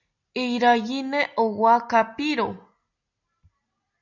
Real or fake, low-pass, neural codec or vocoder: real; 7.2 kHz; none